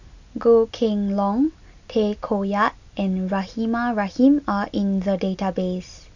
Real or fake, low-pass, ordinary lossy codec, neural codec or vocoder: real; 7.2 kHz; none; none